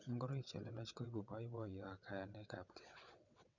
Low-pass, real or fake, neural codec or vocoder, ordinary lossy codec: 7.2 kHz; fake; vocoder, 22.05 kHz, 80 mel bands, WaveNeXt; none